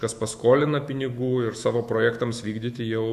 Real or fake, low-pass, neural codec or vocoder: fake; 14.4 kHz; autoencoder, 48 kHz, 128 numbers a frame, DAC-VAE, trained on Japanese speech